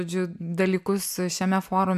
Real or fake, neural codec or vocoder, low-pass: real; none; 14.4 kHz